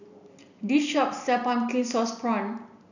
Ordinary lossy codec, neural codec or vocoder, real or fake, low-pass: none; none; real; 7.2 kHz